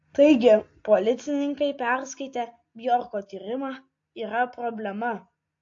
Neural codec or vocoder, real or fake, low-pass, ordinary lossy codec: none; real; 7.2 kHz; AAC, 48 kbps